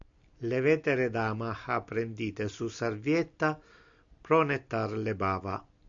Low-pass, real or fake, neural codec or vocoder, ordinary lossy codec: 7.2 kHz; real; none; MP3, 64 kbps